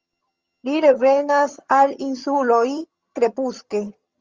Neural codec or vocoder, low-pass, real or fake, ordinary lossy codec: vocoder, 22.05 kHz, 80 mel bands, HiFi-GAN; 7.2 kHz; fake; Opus, 32 kbps